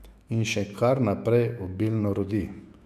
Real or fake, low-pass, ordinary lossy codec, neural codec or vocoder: fake; 14.4 kHz; MP3, 96 kbps; autoencoder, 48 kHz, 128 numbers a frame, DAC-VAE, trained on Japanese speech